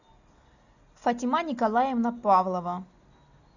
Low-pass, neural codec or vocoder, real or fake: 7.2 kHz; none; real